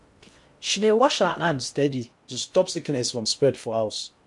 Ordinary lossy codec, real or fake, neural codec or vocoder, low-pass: MP3, 64 kbps; fake; codec, 16 kHz in and 24 kHz out, 0.6 kbps, FocalCodec, streaming, 2048 codes; 10.8 kHz